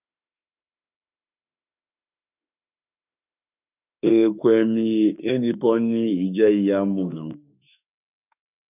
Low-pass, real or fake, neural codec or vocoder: 3.6 kHz; fake; autoencoder, 48 kHz, 32 numbers a frame, DAC-VAE, trained on Japanese speech